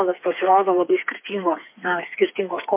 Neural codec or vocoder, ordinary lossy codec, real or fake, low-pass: codec, 16 kHz, 4 kbps, FreqCodec, smaller model; MP3, 24 kbps; fake; 3.6 kHz